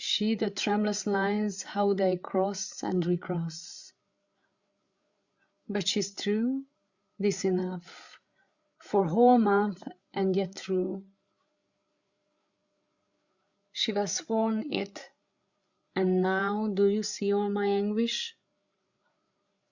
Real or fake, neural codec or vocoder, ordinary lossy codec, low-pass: fake; codec, 16 kHz, 8 kbps, FreqCodec, larger model; Opus, 64 kbps; 7.2 kHz